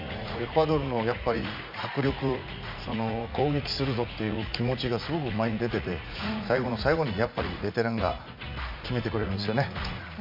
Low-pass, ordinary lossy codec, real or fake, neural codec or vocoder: 5.4 kHz; MP3, 48 kbps; fake; vocoder, 44.1 kHz, 80 mel bands, Vocos